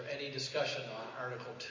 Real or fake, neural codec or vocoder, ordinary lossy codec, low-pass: real; none; MP3, 32 kbps; 7.2 kHz